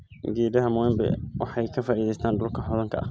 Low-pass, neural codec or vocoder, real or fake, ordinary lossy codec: none; none; real; none